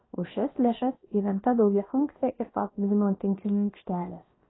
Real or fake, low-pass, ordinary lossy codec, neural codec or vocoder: fake; 7.2 kHz; AAC, 16 kbps; codec, 16 kHz, about 1 kbps, DyCAST, with the encoder's durations